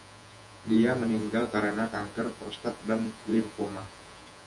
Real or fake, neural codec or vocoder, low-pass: fake; vocoder, 48 kHz, 128 mel bands, Vocos; 10.8 kHz